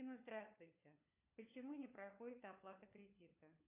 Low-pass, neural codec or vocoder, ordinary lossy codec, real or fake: 3.6 kHz; codec, 16 kHz, 4 kbps, FunCodec, trained on LibriTTS, 50 frames a second; AAC, 32 kbps; fake